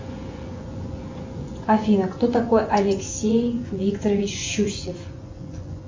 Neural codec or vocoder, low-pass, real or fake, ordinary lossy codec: vocoder, 24 kHz, 100 mel bands, Vocos; 7.2 kHz; fake; MP3, 64 kbps